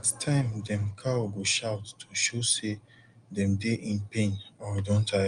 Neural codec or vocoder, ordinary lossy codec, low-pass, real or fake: none; Opus, 32 kbps; 9.9 kHz; real